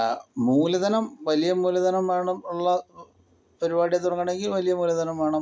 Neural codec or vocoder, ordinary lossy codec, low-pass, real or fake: none; none; none; real